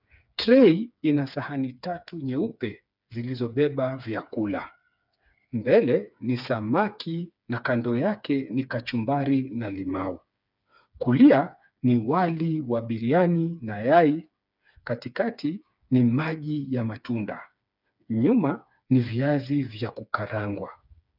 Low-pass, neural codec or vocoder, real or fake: 5.4 kHz; codec, 16 kHz, 4 kbps, FreqCodec, smaller model; fake